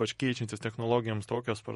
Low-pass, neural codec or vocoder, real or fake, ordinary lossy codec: 19.8 kHz; vocoder, 48 kHz, 128 mel bands, Vocos; fake; MP3, 48 kbps